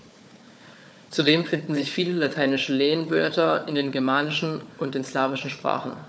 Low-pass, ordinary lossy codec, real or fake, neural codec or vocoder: none; none; fake; codec, 16 kHz, 4 kbps, FunCodec, trained on Chinese and English, 50 frames a second